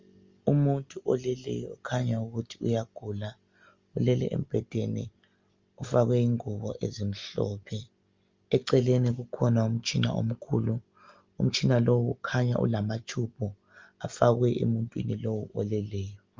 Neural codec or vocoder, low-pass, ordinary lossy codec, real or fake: none; 7.2 kHz; Opus, 32 kbps; real